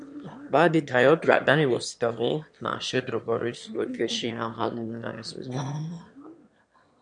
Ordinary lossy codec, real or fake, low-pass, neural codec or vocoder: MP3, 64 kbps; fake; 9.9 kHz; autoencoder, 22.05 kHz, a latent of 192 numbers a frame, VITS, trained on one speaker